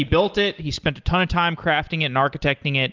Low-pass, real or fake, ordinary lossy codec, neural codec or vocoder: 7.2 kHz; real; Opus, 24 kbps; none